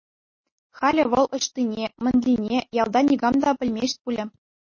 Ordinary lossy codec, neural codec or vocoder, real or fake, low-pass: MP3, 32 kbps; none; real; 7.2 kHz